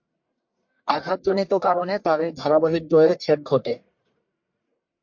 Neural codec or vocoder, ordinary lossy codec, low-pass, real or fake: codec, 44.1 kHz, 1.7 kbps, Pupu-Codec; MP3, 48 kbps; 7.2 kHz; fake